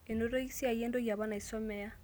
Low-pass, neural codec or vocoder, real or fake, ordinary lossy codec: none; none; real; none